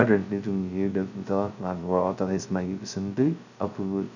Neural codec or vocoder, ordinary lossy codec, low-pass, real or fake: codec, 16 kHz, 0.2 kbps, FocalCodec; none; 7.2 kHz; fake